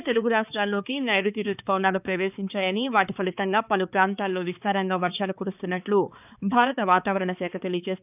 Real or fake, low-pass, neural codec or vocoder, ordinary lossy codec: fake; 3.6 kHz; codec, 16 kHz, 4 kbps, X-Codec, HuBERT features, trained on balanced general audio; none